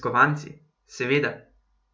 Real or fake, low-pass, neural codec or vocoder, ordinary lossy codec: real; none; none; none